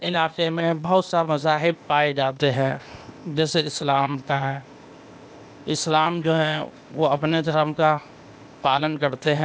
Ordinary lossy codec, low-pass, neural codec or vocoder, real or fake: none; none; codec, 16 kHz, 0.8 kbps, ZipCodec; fake